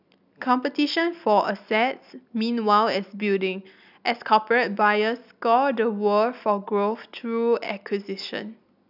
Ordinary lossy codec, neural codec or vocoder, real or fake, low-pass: none; none; real; 5.4 kHz